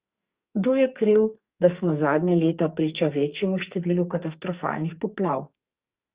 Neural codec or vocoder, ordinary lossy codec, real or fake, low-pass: codec, 44.1 kHz, 2.6 kbps, SNAC; Opus, 64 kbps; fake; 3.6 kHz